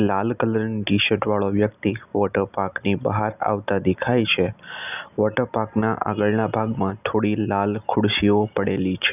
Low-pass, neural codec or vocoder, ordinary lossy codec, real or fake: 3.6 kHz; none; none; real